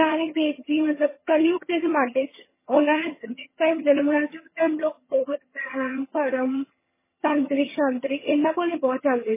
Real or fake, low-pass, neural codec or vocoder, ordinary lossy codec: fake; 3.6 kHz; vocoder, 22.05 kHz, 80 mel bands, HiFi-GAN; MP3, 16 kbps